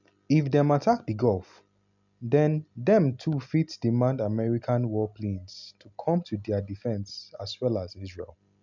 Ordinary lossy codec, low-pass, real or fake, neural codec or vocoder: none; 7.2 kHz; real; none